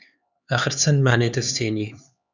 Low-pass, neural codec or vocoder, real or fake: 7.2 kHz; codec, 16 kHz, 4 kbps, X-Codec, HuBERT features, trained on LibriSpeech; fake